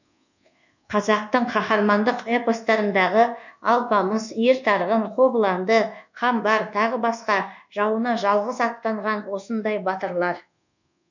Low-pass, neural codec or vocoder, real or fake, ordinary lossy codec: 7.2 kHz; codec, 24 kHz, 1.2 kbps, DualCodec; fake; none